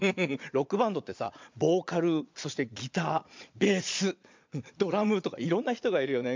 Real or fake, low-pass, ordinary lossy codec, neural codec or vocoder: fake; 7.2 kHz; MP3, 64 kbps; vocoder, 22.05 kHz, 80 mel bands, Vocos